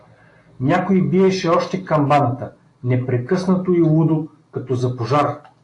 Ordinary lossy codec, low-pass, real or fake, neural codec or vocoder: AAC, 48 kbps; 10.8 kHz; real; none